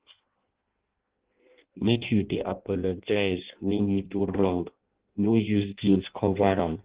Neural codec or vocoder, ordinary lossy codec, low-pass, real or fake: codec, 16 kHz in and 24 kHz out, 0.6 kbps, FireRedTTS-2 codec; Opus, 16 kbps; 3.6 kHz; fake